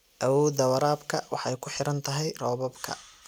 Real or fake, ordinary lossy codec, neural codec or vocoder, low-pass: real; none; none; none